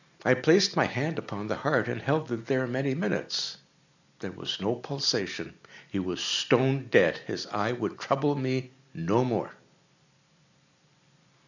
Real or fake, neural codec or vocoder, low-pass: real; none; 7.2 kHz